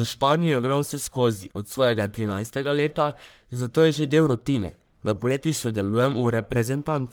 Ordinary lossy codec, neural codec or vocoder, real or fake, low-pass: none; codec, 44.1 kHz, 1.7 kbps, Pupu-Codec; fake; none